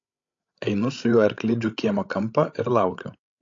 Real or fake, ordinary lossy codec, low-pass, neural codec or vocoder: fake; AAC, 48 kbps; 7.2 kHz; codec, 16 kHz, 16 kbps, FreqCodec, larger model